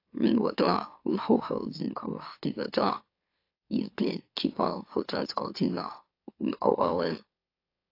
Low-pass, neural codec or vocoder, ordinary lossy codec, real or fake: 5.4 kHz; autoencoder, 44.1 kHz, a latent of 192 numbers a frame, MeloTTS; AAC, 32 kbps; fake